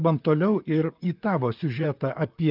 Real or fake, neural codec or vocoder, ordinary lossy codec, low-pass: fake; vocoder, 44.1 kHz, 128 mel bands, Pupu-Vocoder; Opus, 24 kbps; 5.4 kHz